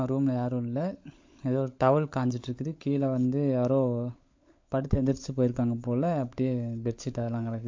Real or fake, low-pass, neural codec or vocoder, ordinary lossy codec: fake; 7.2 kHz; codec, 16 kHz, 16 kbps, FunCodec, trained on LibriTTS, 50 frames a second; MP3, 48 kbps